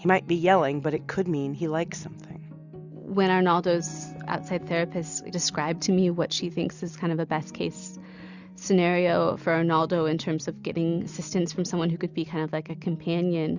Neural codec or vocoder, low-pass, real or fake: none; 7.2 kHz; real